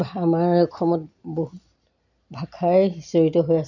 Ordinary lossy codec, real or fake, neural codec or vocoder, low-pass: none; real; none; 7.2 kHz